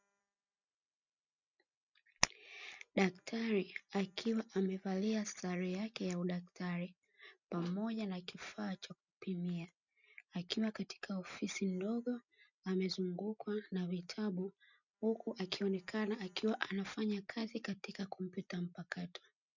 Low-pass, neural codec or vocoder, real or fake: 7.2 kHz; none; real